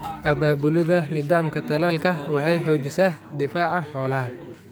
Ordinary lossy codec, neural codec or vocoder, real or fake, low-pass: none; codec, 44.1 kHz, 2.6 kbps, SNAC; fake; none